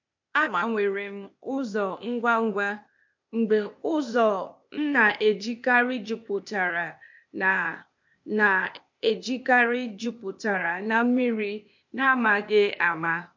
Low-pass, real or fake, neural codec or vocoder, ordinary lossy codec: 7.2 kHz; fake; codec, 16 kHz, 0.8 kbps, ZipCodec; MP3, 48 kbps